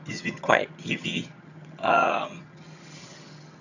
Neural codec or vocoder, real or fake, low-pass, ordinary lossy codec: vocoder, 22.05 kHz, 80 mel bands, HiFi-GAN; fake; 7.2 kHz; none